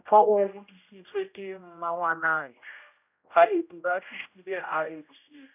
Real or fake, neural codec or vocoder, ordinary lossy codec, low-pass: fake; codec, 16 kHz, 0.5 kbps, X-Codec, HuBERT features, trained on general audio; none; 3.6 kHz